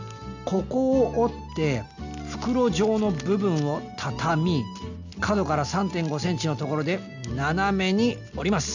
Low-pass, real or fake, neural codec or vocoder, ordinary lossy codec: 7.2 kHz; real; none; none